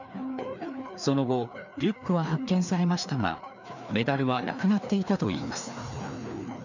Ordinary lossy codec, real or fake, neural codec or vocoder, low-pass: none; fake; codec, 16 kHz, 2 kbps, FreqCodec, larger model; 7.2 kHz